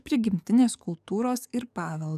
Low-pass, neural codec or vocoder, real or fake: 14.4 kHz; codec, 44.1 kHz, 7.8 kbps, DAC; fake